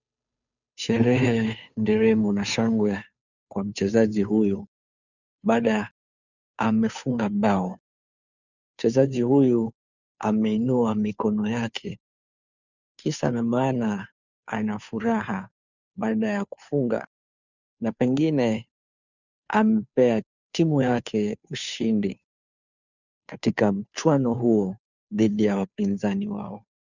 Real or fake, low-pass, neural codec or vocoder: fake; 7.2 kHz; codec, 16 kHz, 2 kbps, FunCodec, trained on Chinese and English, 25 frames a second